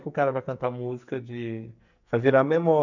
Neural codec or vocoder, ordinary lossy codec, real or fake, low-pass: codec, 44.1 kHz, 2.6 kbps, SNAC; none; fake; 7.2 kHz